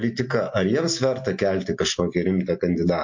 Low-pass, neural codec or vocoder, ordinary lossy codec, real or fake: 7.2 kHz; none; MP3, 48 kbps; real